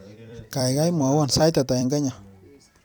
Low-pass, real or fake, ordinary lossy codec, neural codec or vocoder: none; fake; none; vocoder, 44.1 kHz, 128 mel bands every 256 samples, BigVGAN v2